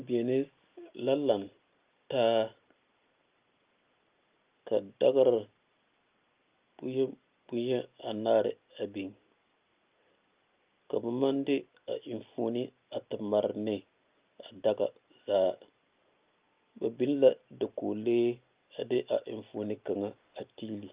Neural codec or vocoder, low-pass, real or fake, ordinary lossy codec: none; 3.6 kHz; real; Opus, 32 kbps